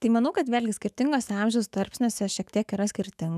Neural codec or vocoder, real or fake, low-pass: autoencoder, 48 kHz, 128 numbers a frame, DAC-VAE, trained on Japanese speech; fake; 14.4 kHz